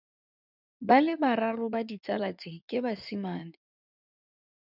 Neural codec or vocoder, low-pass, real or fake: codec, 16 kHz in and 24 kHz out, 2.2 kbps, FireRedTTS-2 codec; 5.4 kHz; fake